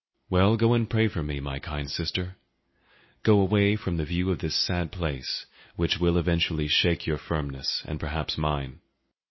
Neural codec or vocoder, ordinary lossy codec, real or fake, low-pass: none; MP3, 24 kbps; real; 7.2 kHz